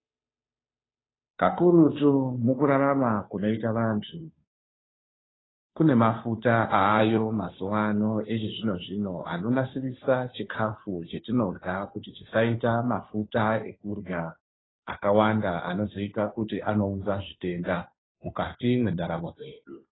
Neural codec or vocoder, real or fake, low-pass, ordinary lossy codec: codec, 16 kHz, 2 kbps, FunCodec, trained on Chinese and English, 25 frames a second; fake; 7.2 kHz; AAC, 16 kbps